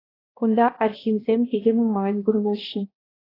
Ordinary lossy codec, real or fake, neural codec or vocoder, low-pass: AAC, 24 kbps; fake; codec, 16 kHz, 1 kbps, X-Codec, HuBERT features, trained on balanced general audio; 5.4 kHz